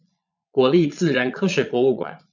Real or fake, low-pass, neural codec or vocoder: fake; 7.2 kHz; vocoder, 22.05 kHz, 80 mel bands, Vocos